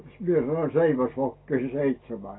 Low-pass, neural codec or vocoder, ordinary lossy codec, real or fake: 7.2 kHz; none; AAC, 16 kbps; real